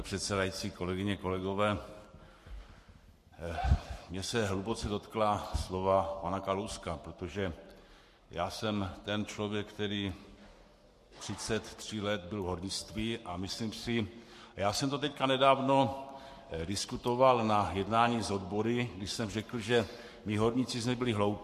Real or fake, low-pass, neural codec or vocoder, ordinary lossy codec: fake; 14.4 kHz; codec, 44.1 kHz, 7.8 kbps, Pupu-Codec; MP3, 64 kbps